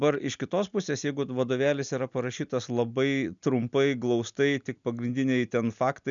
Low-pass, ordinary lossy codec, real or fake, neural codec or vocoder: 7.2 kHz; MP3, 96 kbps; real; none